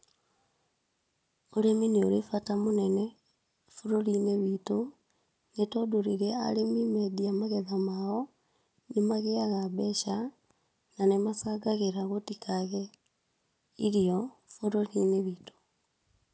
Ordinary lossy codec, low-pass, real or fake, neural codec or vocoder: none; none; real; none